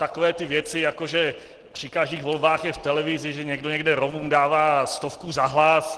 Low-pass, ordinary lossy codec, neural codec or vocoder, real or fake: 10.8 kHz; Opus, 16 kbps; none; real